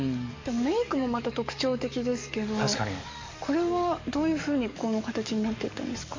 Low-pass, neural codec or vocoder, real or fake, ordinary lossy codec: 7.2 kHz; codec, 44.1 kHz, 7.8 kbps, DAC; fake; MP3, 48 kbps